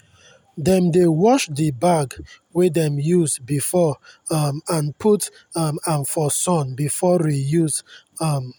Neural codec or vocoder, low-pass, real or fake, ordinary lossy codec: none; none; real; none